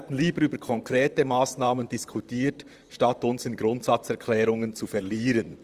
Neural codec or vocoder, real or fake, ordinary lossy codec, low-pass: vocoder, 44.1 kHz, 128 mel bands, Pupu-Vocoder; fake; Opus, 32 kbps; 14.4 kHz